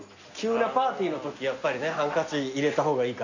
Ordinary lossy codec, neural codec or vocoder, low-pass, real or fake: none; none; 7.2 kHz; real